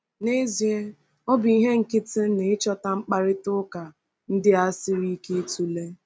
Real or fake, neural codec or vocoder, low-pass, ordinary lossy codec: real; none; none; none